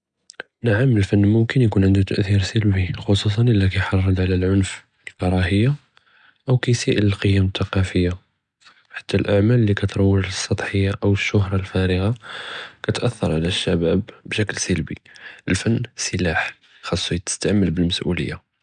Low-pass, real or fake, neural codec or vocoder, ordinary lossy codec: 9.9 kHz; real; none; none